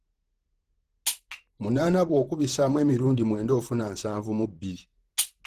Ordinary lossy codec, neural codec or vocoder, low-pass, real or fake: Opus, 16 kbps; vocoder, 44.1 kHz, 128 mel bands, Pupu-Vocoder; 14.4 kHz; fake